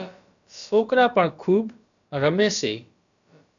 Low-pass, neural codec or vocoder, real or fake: 7.2 kHz; codec, 16 kHz, about 1 kbps, DyCAST, with the encoder's durations; fake